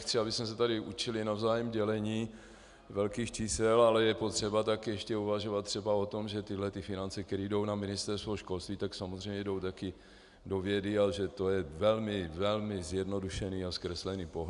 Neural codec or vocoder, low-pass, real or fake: none; 10.8 kHz; real